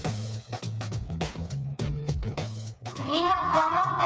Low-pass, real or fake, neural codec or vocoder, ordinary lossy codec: none; fake; codec, 16 kHz, 4 kbps, FreqCodec, smaller model; none